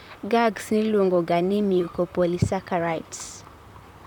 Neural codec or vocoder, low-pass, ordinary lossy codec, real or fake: vocoder, 44.1 kHz, 128 mel bands, Pupu-Vocoder; 19.8 kHz; none; fake